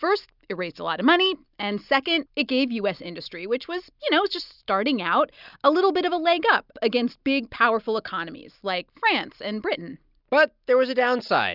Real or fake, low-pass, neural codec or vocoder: real; 5.4 kHz; none